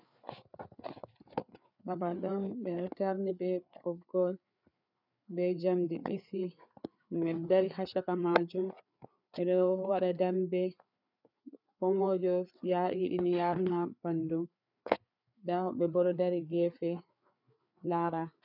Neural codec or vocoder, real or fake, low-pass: codec, 16 kHz, 4 kbps, FreqCodec, larger model; fake; 5.4 kHz